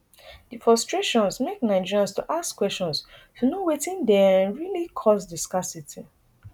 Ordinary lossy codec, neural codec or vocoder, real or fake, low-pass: none; none; real; 19.8 kHz